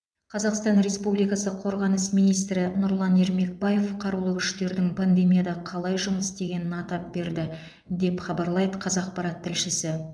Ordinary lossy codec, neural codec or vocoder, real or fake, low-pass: none; vocoder, 22.05 kHz, 80 mel bands, WaveNeXt; fake; none